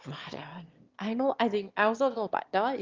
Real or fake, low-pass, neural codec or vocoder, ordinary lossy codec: fake; 7.2 kHz; autoencoder, 22.05 kHz, a latent of 192 numbers a frame, VITS, trained on one speaker; Opus, 32 kbps